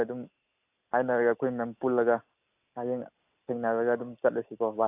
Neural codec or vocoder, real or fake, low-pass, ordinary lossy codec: none; real; 3.6 kHz; AAC, 32 kbps